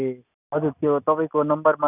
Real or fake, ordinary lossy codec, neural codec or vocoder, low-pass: real; none; none; 3.6 kHz